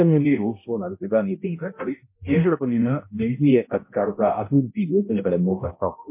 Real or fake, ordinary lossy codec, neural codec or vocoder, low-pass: fake; MP3, 24 kbps; codec, 16 kHz, 0.5 kbps, X-Codec, HuBERT features, trained on balanced general audio; 3.6 kHz